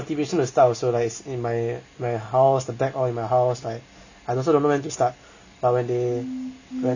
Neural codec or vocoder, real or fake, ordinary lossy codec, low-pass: none; real; none; 7.2 kHz